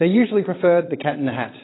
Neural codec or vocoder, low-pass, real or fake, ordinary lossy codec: vocoder, 22.05 kHz, 80 mel bands, WaveNeXt; 7.2 kHz; fake; AAC, 16 kbps